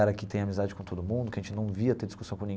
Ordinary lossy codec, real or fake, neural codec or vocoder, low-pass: none; real; none; none